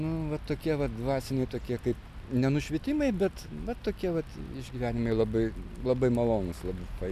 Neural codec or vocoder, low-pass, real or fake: none; 14.4 kHz; real